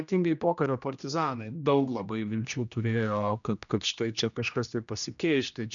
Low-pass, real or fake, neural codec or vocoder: 7.2 kHz; fake; codec, 16 kHz, 1 kbps, X-Codec, HuBERT features, trained on general audio